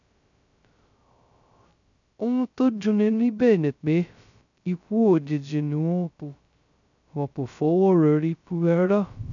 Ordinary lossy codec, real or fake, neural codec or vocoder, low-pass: none; fake; codec, 16 kHz, 0.2 kbps, FocalCodec; 7.2 kHz